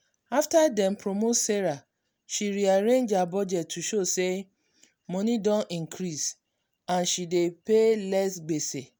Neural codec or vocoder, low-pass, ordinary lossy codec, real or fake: none; none; none; real